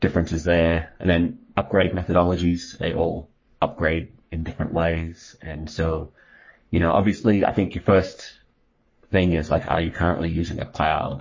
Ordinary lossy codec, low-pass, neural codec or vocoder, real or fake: MP3, 32 kbps; 7.2 kHz; codec, 44.1 kHz, 3.4 kbps, Pupu-Codec; fake